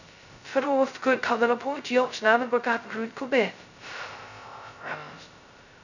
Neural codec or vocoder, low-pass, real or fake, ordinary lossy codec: codec, 16 kHz, 0.2 kbps, FocalCodec; 7.2 kHz; fake; none